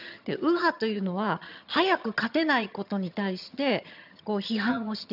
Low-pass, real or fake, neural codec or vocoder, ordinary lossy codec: 5.4 kHz; fake; vocoder, 22.05 kHz, 80 mel bands, HiFi-GAN; none